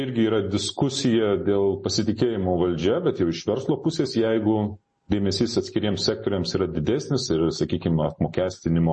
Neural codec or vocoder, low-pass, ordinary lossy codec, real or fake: none; 10.8 kHz; MP3, 32 kbps; real